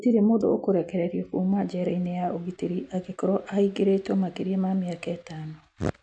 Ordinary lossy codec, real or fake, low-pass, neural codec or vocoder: none; real; 9.9 kHz; none